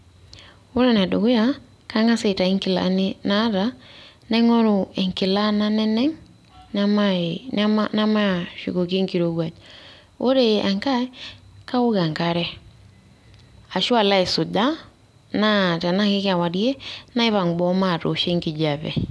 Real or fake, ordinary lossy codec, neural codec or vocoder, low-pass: real; none; none; none